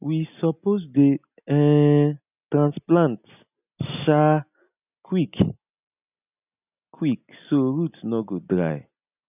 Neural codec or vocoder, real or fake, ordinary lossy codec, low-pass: none; real; none; 3.6 kHz